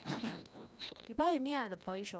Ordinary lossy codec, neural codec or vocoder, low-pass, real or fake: none; codec, 16 kHz, 1 kbps, FreqCodec, larger model; none; fake